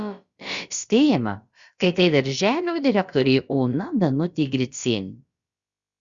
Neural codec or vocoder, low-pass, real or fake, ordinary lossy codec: codec, 16 kHz, about 1 kbps, DyCAST, with the encoder's durations; 7.2 kHz; fake; Opus, 64 kbps